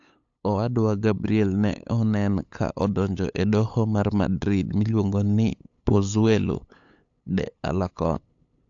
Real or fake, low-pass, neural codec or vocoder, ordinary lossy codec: fake; 7.2 kHz; codec, 16 kHz, 8 kbps, FunCodec, trained on LibriTTS, 25 frames a second; AAC, 64 kbps